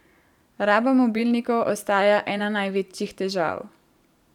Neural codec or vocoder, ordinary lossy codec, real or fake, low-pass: vocoder, 44.1 kHz, 128 mel bands every 512 samples, BigVGAN v2; none; fake; 19.8 kHz